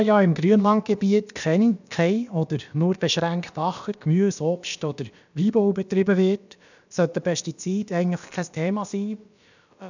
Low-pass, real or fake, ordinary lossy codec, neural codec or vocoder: 7.2 kHz; fake; none; codec, 16 kHz, about 1 kbps, DyCAST, with the encoder's durations